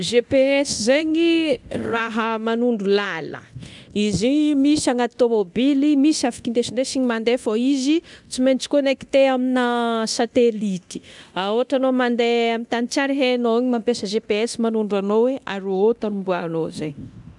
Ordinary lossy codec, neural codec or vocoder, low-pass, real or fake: none; codec, 24 kHz, 0.9 kbps, DualCodec; 10.8 kHz; fake